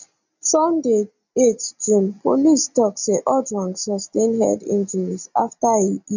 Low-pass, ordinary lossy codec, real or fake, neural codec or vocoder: 7.2 kHz; none; real; none